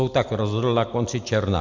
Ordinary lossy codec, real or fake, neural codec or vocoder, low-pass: MP3, 64 kbps; real; none; 7.2 kHz